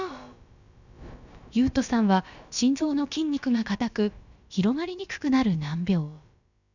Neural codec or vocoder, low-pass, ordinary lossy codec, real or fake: codec, 16 kHz, about 1 kbps, DyCAST, with the encoder's durations; 7.2 kHz; none; fake